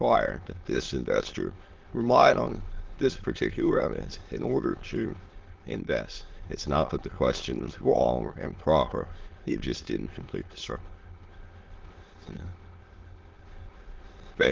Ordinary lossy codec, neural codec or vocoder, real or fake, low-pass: Opus, 16 kbps; autoencoder, 22.05 kHz, a latent of 192 numbers a frame, VITS, trained on many speakers; fake; 7.2 kHz